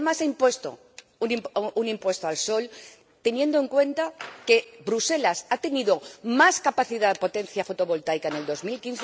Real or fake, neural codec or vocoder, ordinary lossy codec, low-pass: real; none; none; none